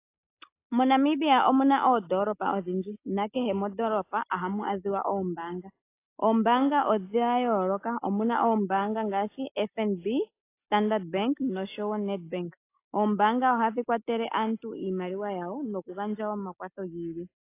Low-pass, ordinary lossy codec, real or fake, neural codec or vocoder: 3.6 kHz; AAC, 24 kbps; real; none